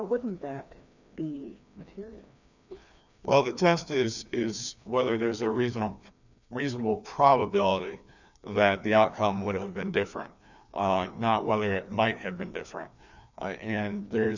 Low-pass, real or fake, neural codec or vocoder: 7.2 kHz; fake; codec, 16 kHz, 2 kbps, FreqCodec, larger model